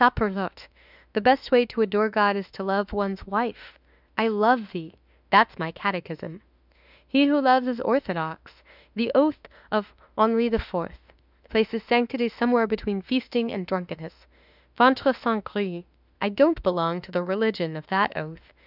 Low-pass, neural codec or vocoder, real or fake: 5.4 kHz; autoencoder, 48 kHz, 32 numbers a frame, DAC-VAE, trained on Japanese speech; fake